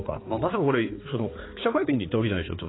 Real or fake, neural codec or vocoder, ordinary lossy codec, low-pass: fake; codec, 16 kHz, 4 kbps, X-Codec, HuBERT features, trained on balanced general audio; AAC, 16 kbps; 7.2 kHz